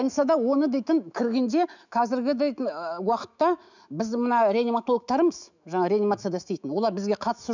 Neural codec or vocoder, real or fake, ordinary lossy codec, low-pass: autoencoder, 48 kHz, 128 numbers a frame, DAC-VAE, trained on Japanese speech; fake; none; 7.2 kHz